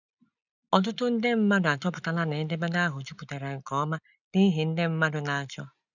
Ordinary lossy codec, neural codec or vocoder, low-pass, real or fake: none; none; 7.2 kHz; real